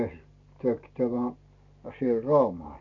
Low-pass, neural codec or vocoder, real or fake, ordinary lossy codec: 7.2 kHz; none; real; none